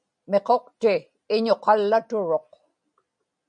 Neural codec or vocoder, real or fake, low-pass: none; real; 9.9 kHz